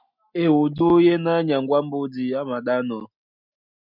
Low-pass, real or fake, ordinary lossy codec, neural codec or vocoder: 5.4 kHz; real; MP3, 48 kbps; none